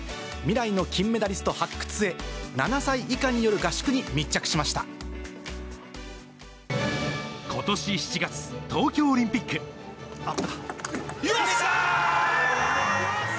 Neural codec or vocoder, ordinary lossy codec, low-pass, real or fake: none; none; none; real